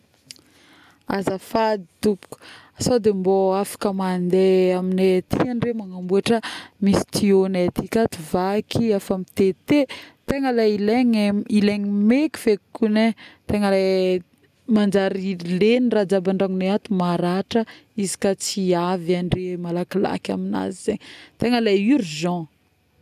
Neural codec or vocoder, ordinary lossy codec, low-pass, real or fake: none; none; 14.4 kHz; real